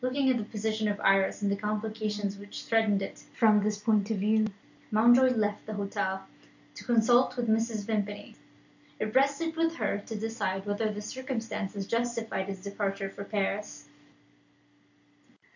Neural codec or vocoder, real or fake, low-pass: none; real; 7.2 kHz